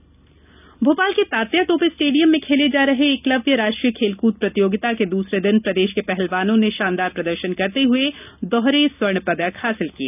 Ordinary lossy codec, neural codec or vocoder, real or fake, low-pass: none; none; real; 3.6 kHz